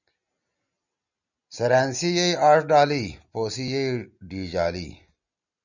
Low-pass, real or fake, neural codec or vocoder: 7.2 kHz; real; none